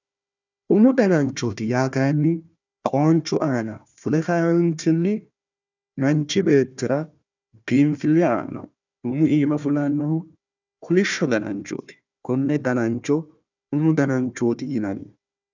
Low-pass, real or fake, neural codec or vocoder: 7.2 kHz; fake; codec, 16 kHz, 1 kbps, FunCodec, trained on Chinese and English, 50 frames a second